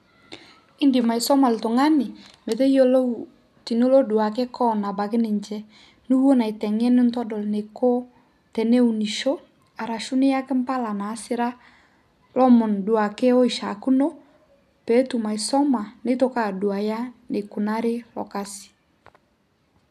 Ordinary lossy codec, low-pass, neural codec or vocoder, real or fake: none; 14.4 kHz; none; real